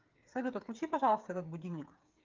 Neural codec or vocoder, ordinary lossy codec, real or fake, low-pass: codec, 16 kHz, 8 kbps, FreqCodec, smaller model; Opus, 24 kbps; fake; 7.2 kHz